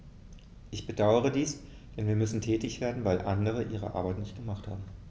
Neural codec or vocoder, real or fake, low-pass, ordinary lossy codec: none; real; none; none